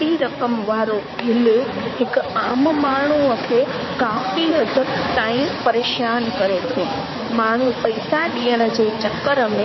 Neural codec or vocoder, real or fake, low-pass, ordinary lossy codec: codec, 16 kHz, 4 kbps, X-Codec, HuBERT features, trained on balanced general audio; fake; 7.2 kHz; MP3, 24 kbps